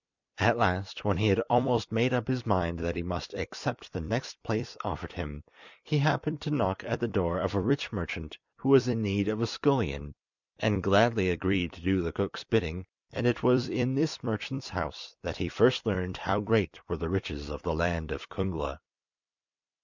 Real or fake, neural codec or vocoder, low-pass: fake; vocoder, 44.1 kHz, 128 mel bands every 256 samples, BigVGAN v2; 7.2 kHz